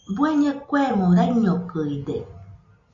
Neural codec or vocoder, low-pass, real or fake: none; 7.2 kHz; real